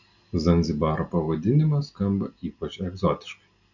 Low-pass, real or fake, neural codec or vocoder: 7.2 kHz; real; none